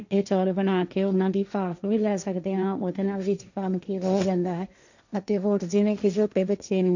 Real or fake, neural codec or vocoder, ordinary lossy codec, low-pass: fake; codec, 16 kHz, 1.1 kbps, Voila-Tokenizer; none; 7.2 kHz